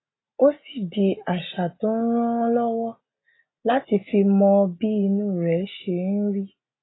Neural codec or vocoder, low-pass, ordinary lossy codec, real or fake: none; 7.2 kHz; AAC, 16 kbps; real